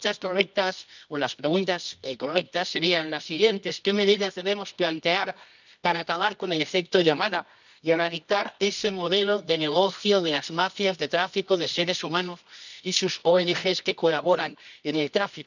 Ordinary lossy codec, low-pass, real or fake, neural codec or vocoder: none; 7.2 kHz; fake; codec, 24 kHz, 0.9 kbps, WavTokenizer, medium music audio release